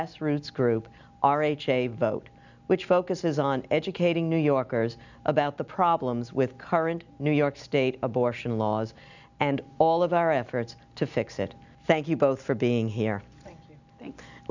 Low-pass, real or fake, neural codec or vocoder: 7.2 kHz; real; none